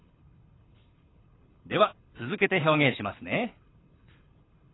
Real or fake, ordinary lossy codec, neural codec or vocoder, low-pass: fake; AAC, 16 kbps; codec, 24 kHz, 6 kbps, HILCodec; 7.2 kHz